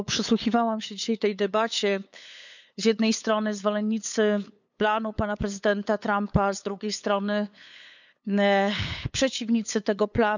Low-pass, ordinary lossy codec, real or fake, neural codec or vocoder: 7.2 kHz; none; fake; codec, 16 kHz, 8 kbps, FunCodec, trained on LibriTTS, 25 frames a second